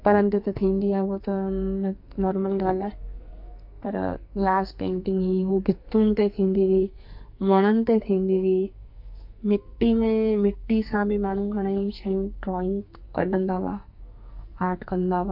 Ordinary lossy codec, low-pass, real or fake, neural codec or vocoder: MP3, 48 kbps; 5.4 kHz; fake; codec, 44.1 kHz, 2.6 kbps, SNAC